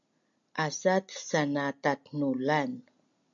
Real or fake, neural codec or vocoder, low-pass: real; none; 7.2 kHz